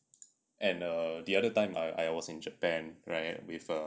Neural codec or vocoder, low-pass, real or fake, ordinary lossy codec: none; none; real; none